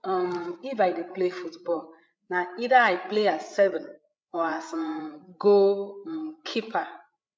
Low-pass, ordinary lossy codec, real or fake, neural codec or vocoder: none; none; fake; codec, 16 kHz, 8 kbps, FreqCodec, larger model